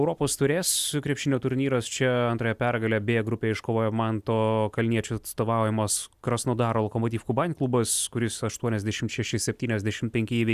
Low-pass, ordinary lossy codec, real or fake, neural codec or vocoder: 14.4 kHz; Opus, 64 kbps; real; none